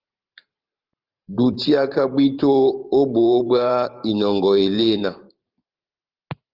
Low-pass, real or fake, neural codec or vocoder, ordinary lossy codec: 5.4 kHz; fake; vocoder, 44.1 kHz, 128 mel bands every 512 samples, BigVGAN v2; Opus, 24 kbps